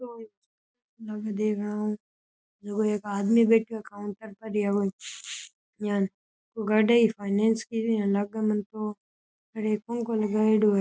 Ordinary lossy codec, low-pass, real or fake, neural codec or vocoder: none; none; real; none